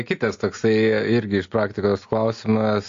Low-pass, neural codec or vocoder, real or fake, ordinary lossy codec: 7.2 kHz; none; real; AAC, 48 kbps